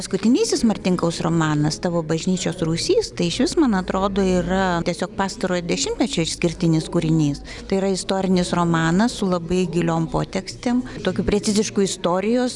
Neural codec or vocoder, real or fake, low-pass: none; real; 10.8 kHz